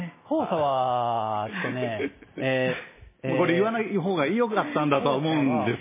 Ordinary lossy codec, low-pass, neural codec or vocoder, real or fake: MP3, 16 kbps; 3.6 kHz; none; real